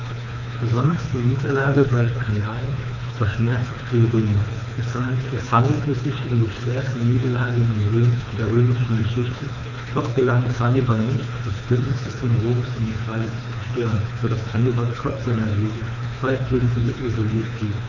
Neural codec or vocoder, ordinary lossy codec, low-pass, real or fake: codec, 24 kHz, 3 kbps, HILCodec; none; 7.2 kHz; fake